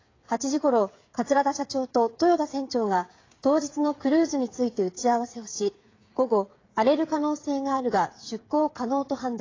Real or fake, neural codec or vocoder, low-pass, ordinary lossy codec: fake; codec, 16 kHz, 8 kbps, FreqCodec, smaller model; 7.2 kHz; AAC, 32 kbps